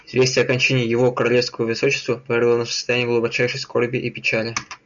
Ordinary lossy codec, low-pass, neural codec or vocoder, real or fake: MP3, 96 kbps; 7.2 kHz; none; real